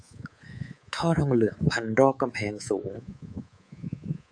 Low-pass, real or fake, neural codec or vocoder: 9.9 kHz; fake; codec, 24 kHz, 3.1 kbps, DualCodec